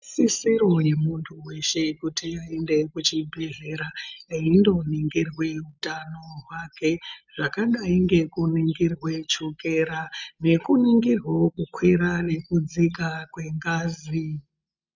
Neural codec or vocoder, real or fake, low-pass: none; real; 7.2 kHz